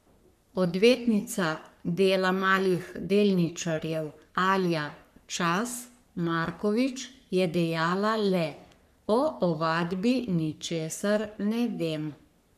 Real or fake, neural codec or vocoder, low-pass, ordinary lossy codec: fake; codec, 44.1 kHz, 3.4 kbps, Pupu-Codec; 14.4 kHz; none